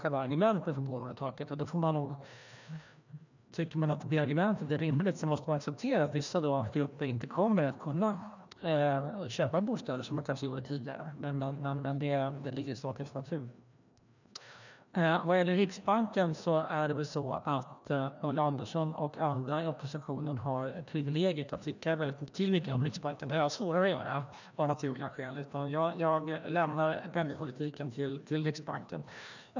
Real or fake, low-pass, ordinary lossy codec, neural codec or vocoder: fake; 7.2 kHz; none; codec, 16 kHz, 1 kbps, FreqCodec, larger model